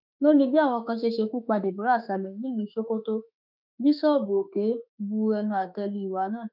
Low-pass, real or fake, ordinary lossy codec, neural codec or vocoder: 5.4 kHz; fake; none; autoencoder, 48 kHz, 32 numbers a frame, DAC-VAE, trained on Japanese speech